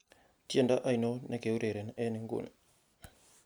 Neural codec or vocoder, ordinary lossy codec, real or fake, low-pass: none; none; real; none